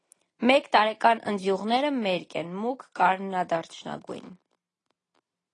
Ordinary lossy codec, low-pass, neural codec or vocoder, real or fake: AAC, 32 kbps; 10.8 kHz; none; real